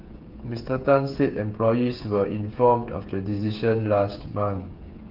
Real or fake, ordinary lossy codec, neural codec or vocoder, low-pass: fake; Opus, 32 kbps; codec, 16 kHz, 8 kbps, FreqCodec, smaller model; 5.4 kHz